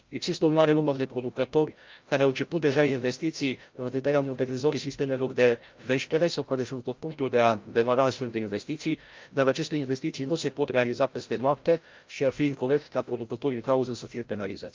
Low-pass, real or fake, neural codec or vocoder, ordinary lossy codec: 7.2 kHz; fake; codec, 16 kHz, 0.5 kbps, FreqCodec, larger model; Opus, 24 kbps